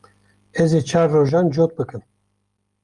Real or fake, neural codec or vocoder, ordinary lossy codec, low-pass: real; none; Opus, 32 kbps; 10.8 kHz